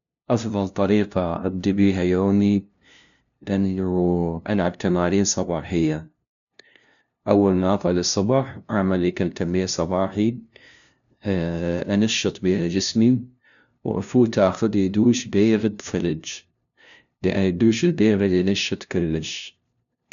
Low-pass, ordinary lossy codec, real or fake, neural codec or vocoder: 7.2 kHz; none; fake; codec, 16 kHz, 0.5 kbps, FunCodec, trained on LibriTTS, 25 frames a second